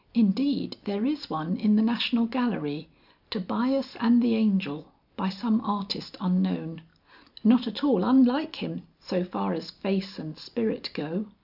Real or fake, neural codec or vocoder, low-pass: real; none; 5.4 kHz